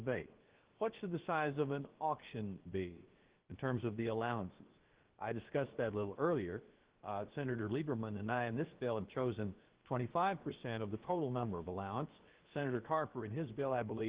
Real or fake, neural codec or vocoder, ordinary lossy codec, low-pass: fake; codec, 16 kHz, about 1 kbps, DyCAST, with the encoder's durations; Opus, 16 kbps; 3.6 kHz